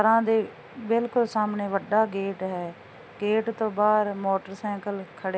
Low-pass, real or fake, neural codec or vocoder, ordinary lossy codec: none; real; none; none